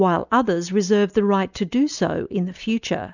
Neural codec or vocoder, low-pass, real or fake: none; 7.2 kHz; real